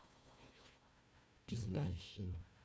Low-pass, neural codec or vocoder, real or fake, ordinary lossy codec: none; codec, 16 kHz, 1 kbps, FunCodec, trained on Chinese and English, 50 frames a second; fake; none